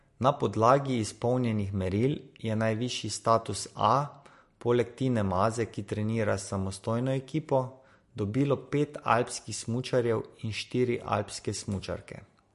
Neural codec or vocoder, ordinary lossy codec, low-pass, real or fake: none; MP3, 48 kbps; 14.4 kHz; real